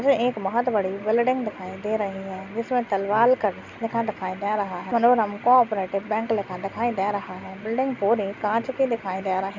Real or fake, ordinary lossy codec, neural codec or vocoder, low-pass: real; none; none; 7.2 kHz